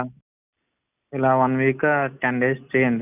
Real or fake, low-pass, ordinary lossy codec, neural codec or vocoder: real; 3.6 kHz; none; none